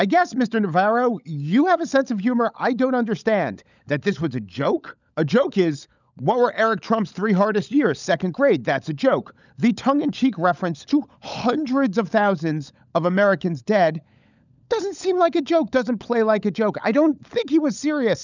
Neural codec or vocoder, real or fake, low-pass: codec, 16 kHz, 16 kbps, FunCodec, trained on LibriTTS, 50 frames a second; fake; 7.2 kHz